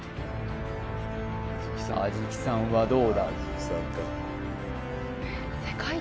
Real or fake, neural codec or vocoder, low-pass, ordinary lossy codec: real; none; none; none